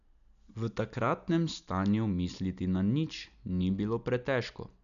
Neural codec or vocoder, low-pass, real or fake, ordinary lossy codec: none; 7.2 kHz; real; none